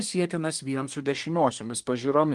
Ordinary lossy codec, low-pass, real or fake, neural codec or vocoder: Opus, 24 kbps; 10.8 kHz; fake; codec, 24 kHz, 1 kbps, SNAC